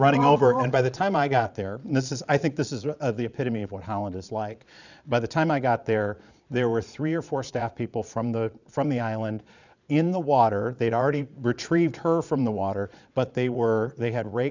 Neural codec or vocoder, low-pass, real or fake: vocoder, 22.05 kHz, 80 mel bands, Vocos; 7.2 kHz; fake